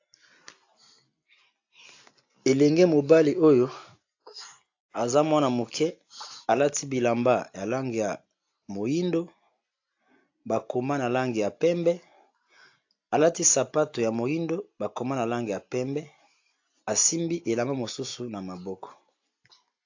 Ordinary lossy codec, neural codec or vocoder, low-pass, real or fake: AAC, 48 kbps; none; 7.2 kHz; real